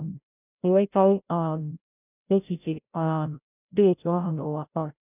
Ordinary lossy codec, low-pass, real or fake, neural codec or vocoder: none; 3.6 kHz; fake; codec, 16 kHz, 0.5 kbps, FreqCodec, larger model